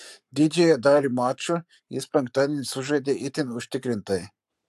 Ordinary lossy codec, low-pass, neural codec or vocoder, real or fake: AAC, 96 kbps; 14.4 kHz; codec, 44.1 kHz, 7.8 kbps, Pupu-Codec; fake